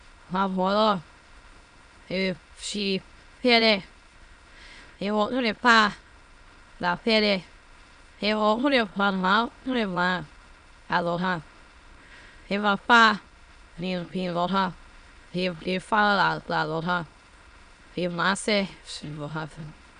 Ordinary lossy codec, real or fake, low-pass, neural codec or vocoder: none; fake; 9.9 kHz; autoencoder, 22.05 kHz, a latent of 192 numbers a frame, VITS, trained on many speakers